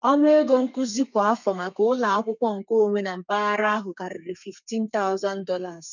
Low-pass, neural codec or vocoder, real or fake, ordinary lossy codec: 7.2 kHz; codec, 32 kHz, 1.9 kbps, SNAC; fake; none